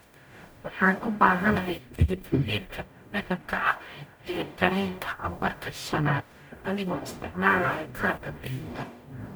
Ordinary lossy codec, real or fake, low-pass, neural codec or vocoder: none; fake; none; codec, 44.1 kHz, 0.9 kbps, DAC